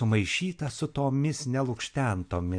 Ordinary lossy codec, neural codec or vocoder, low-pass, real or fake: MP3, 96 kbps; none; 9.9 kHz; real